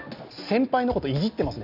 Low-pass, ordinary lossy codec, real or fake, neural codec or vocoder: 5.4 kHz; none; real; none